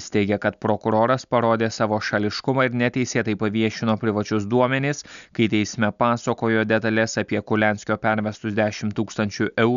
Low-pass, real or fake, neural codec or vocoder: 7.2 kHz; real; none